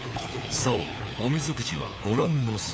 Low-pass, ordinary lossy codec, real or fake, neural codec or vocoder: none; none; fake; codec, 16 kHz, 4 kbps, FunCodec, trained on LibriTTS, 50 frames a second